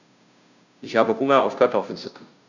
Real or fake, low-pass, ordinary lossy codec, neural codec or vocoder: fake; 7.2 kHz; none; codec, 16 kHz, 0.5 kbps, FunCodec, trained on Chinese and English, 25 frames a second